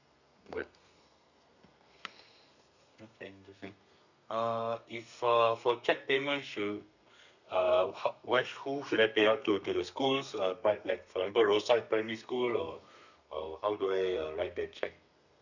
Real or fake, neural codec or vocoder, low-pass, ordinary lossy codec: fake; codec, 32 kHz, 1.9 kbps, SNAC; 7.2 kHz; none